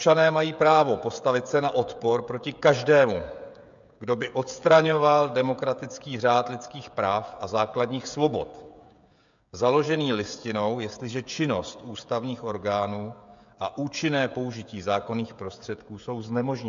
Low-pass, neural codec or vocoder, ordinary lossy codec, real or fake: 7.2 kHz; codec, 16 kHz, 16 kbps, FreqCodec, smaller model; MP3, 64 kbps; fake